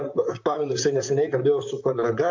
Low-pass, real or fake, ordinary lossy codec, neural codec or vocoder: 7.2 kHz; fake; AAC, 48 kbps; vocoder, 44.1 kHz, 128 mel bands, Pupu-Vocoder